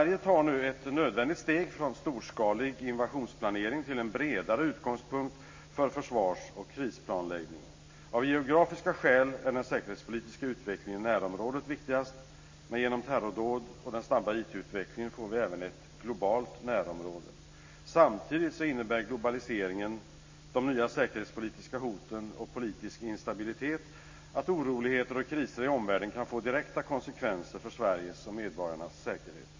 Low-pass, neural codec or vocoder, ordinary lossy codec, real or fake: 7.2 kHz; none; MP3, 32 kbps; real